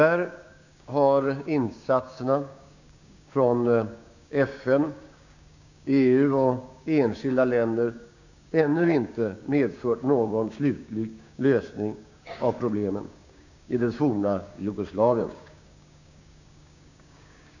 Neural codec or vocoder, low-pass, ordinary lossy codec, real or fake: codec, 16 kHz, 6 kbps, DAC; 7.2 kHz; none; fake